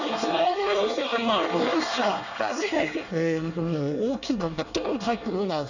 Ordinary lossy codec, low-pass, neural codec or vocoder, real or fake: none; 7.2 kHz; codec, 24 kHz, 1 kbps, SNAC; fake